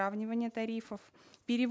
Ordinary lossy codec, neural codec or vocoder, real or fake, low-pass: none; none; real; none